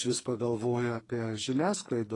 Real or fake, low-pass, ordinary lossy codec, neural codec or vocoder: fake; 10.8 kHz; AAC, 32 kbps; codec, 44.1 kHz, 2.6 kbps, SNAC